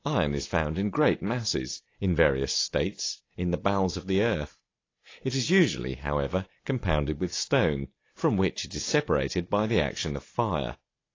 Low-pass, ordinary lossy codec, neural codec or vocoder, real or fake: 7.2 kHz; AAC, 32 kbps; none; real